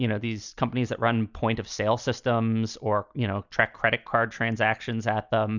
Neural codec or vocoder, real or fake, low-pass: none; real; 7.2 kHz